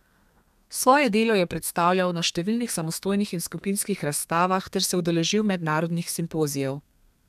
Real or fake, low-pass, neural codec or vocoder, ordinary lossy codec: fake; 14.4 kHz; codec, 32 kHz, 1.9 kbps, SNAC; none